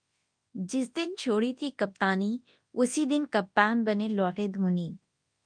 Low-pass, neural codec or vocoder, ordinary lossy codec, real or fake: 9.9 kHz; codec, 24 kHz, 0.9 kbps, WavTokenizer, large speech release; Opus, 32 kbps; fake